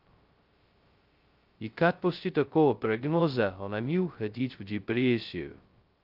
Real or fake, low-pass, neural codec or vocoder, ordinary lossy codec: fake; 5.4 kHz; codec, 16 kHz, 0.2 kbps, FocalCodec; Opus, 32 kbps